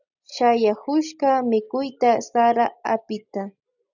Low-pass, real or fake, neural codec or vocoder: 7.2 kHz; real; none